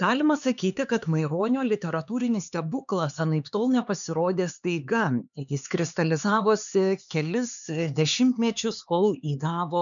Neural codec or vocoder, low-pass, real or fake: codec, 16 kHz, 4 kbps, X-Codec, HuBERT features, trained on LibriSpeech; 7.2 kHz; fake